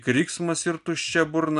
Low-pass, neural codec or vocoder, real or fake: 10.8 kHz; none; real